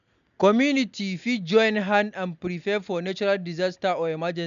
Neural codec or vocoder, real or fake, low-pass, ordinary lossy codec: none; real; 7.2 kHz; MP3, 96 kbps